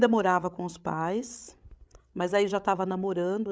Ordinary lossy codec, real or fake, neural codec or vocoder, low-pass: none; fake; codec, 16 kHz, 16 kbps, FreqCodec, larger model; none